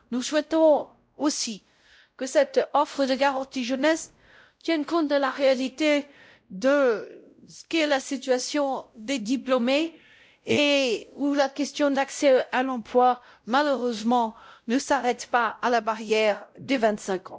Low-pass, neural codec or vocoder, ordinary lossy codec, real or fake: none; codec, 16 kHz, 0.5 kbps, X-Codec, WavLM features, trained on Multilingual LibriSpeech; none; fake